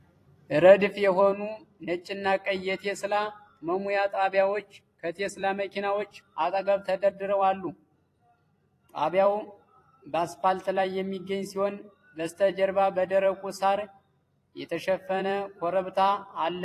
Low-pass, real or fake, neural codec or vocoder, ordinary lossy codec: 14.4 kHz; fake; vocoder, 44.1 kHz, 128 mel bands every 256 samples, BigVGAN v2; AAC, 48 kbps